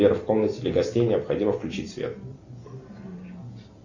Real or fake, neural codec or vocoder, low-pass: real; none; 7.2 kHz